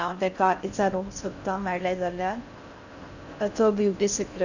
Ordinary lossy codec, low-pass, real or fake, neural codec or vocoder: none; 7.2 kHz; fake; codec, 16 kHz in and 24 kHz out, 0.6 kbps, FocalCodec, streaming, 4096 codes